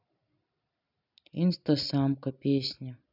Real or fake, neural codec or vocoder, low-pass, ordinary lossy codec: real; none; 5.4 kHz; none